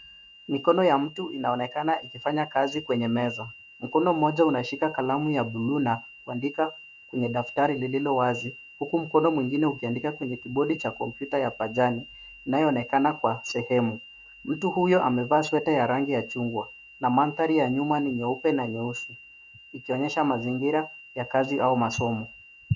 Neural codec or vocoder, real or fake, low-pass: autoencoder, 48 kHz, 128 numbers a frame, DAC-VAE, trained on Japanese speech; fake; 7.2 kHz